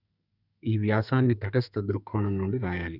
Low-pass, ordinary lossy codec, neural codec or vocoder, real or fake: 5.4 kHz; none; codec, 32 kHz, 1.9 kbps, SNAC; fake